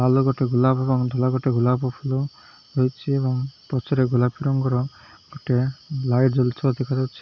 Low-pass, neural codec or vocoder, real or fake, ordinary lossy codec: 7.2 kHz; none; real; none